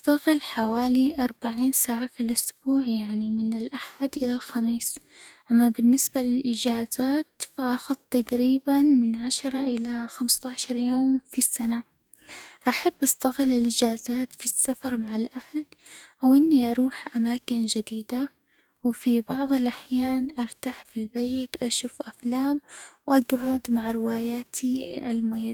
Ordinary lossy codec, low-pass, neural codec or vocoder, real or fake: none; none; codec, 44.1 kHz, 2.6 kbps, DAC; fake